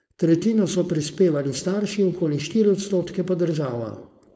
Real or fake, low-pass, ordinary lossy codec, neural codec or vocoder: fake; none; none; codec, 16 kHz, 4.8 kbps, FACodec